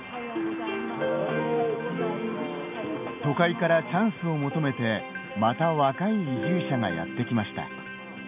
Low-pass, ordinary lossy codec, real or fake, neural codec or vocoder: 3.6 kHz; none; real; none